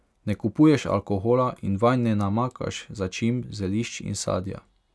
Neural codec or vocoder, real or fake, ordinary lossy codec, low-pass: none; real; none; none